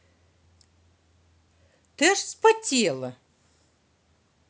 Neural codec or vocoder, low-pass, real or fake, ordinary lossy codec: none; none; real; none